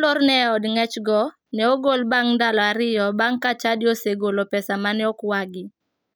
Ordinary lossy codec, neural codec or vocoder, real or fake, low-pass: none; none; real; none